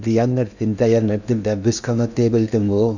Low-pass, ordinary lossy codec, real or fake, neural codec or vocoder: 7.2 kHz; none; fake; codec, 16 kHz in and 24 kHz out, 0.8 kbps, FocalCodec, streaming, 65536 codes